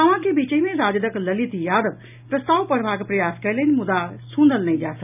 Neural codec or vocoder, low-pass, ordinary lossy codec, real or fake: none; 3.6 kHz; none; real